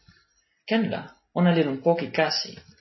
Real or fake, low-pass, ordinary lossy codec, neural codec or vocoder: real; 7.2 kHz; MP3, 24 kbps; none